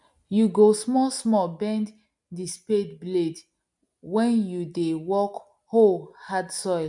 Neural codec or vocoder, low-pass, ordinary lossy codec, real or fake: none; 10.8 kHz; MP3, 64 kbps; real